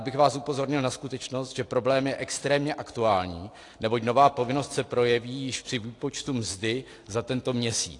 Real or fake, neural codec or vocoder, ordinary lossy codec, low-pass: real; none; AAC, 48 kbps; 10.8 kHz